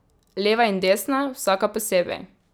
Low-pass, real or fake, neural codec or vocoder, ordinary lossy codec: none; real; none; none